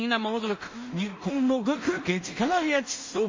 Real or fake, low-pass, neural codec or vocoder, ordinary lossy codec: fake; 7.2 kHz; codec, 16 kHz in and 24 kHz out, 0.4 kbps, LongCat-Audio-Codec, two codebook decoder; MP3, 32 kbps